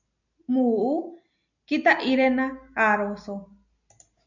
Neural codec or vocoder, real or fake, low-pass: none; real; 7.2 kHz